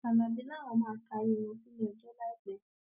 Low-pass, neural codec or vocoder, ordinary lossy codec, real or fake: 3.6 kHz; none; none; real